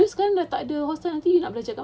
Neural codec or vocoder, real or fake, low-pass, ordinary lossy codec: none; real; none; none